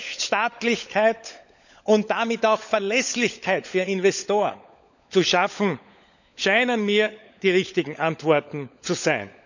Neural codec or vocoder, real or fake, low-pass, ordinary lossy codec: codec, 16 kHz, 16 kbps, FunCodec, trained on LibriTTS, 50 frames a second; fake; 7.2 kHz; none